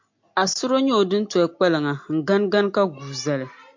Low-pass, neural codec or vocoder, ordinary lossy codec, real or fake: 7.2 kHz; none; MP3, 48 kbps; real